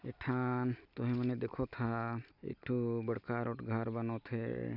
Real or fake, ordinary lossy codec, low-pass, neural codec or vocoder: real; none; 5.4 kHz; none